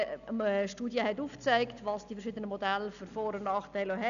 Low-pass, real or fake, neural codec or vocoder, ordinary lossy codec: 7.2 kHz; real; none; none